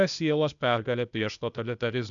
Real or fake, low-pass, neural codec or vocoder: fake; 7.2 kHz; codec, 16 kHz, 0.8 kbps, ZipCodec